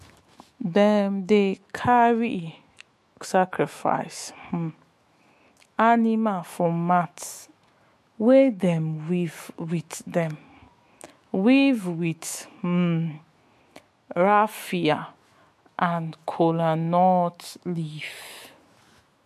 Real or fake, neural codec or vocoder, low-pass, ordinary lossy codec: fake; autoencoder, 48 kHz, 128 numbers a frame, DAC-VAE, trained on Japanese speech; 14.4 kHz; MP3, 64 kbps